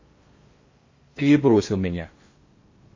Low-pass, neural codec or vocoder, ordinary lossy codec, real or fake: 7.2 kHz; codec, 16 kHz in and 24 kHz out, 0.6 kbps, FocalCodec, streaming, 4096 codes; MP3, 32 kbps; fake